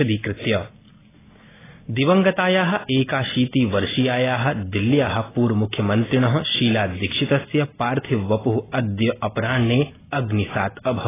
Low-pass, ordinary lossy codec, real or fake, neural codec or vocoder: 3.6 kHz; AAC, 16 kbps; real; none